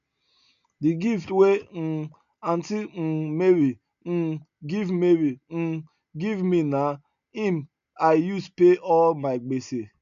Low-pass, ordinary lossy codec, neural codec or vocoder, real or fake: 7.2 kHz; none; none; real